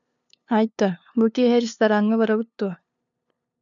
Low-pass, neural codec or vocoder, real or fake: 7.2 kHz; codec, 16 kHz, 2 kbps, FunCodec, trained on LibriTTS, 25 frames a second; fake